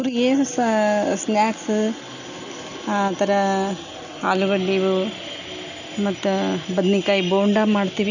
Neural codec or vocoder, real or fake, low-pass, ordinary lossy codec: none; real; 7.2 kHz; none